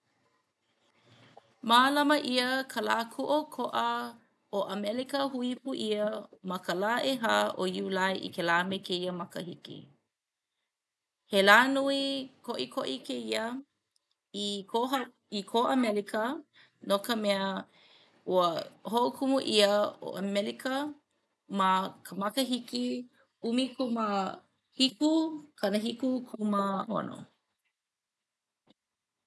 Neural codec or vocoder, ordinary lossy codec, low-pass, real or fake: none; none; none; real